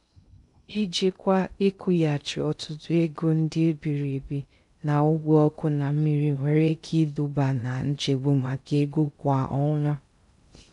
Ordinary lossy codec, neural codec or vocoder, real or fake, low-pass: none; codec, 16 kHz in and 24 kHz out, 0.6 kbps, FocalCodec, streaming, 2048 codes; fake; 10.8 kHz